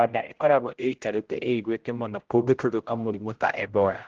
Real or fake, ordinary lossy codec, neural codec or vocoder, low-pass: fake; Opus, 16 kbps; codec, 16 kHz, 0.5 kbps, X-Codec, HuBERT features, trained on general audio; 7.2 kHz